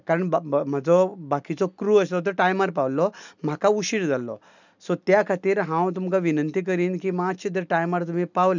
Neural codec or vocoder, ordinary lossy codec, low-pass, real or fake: none; none; 7.2 kHz; real